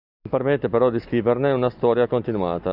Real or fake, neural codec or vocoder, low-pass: real; none; 5.4 kHz